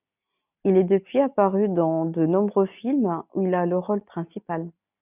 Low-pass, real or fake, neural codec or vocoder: 3.6 kHz; real; none